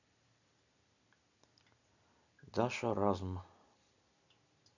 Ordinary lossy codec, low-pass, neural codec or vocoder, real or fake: none; 7.2 kHz; none; real